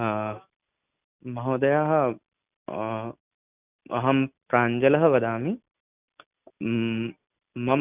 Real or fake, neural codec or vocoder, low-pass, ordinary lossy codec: real; none; 3.6 kHz; none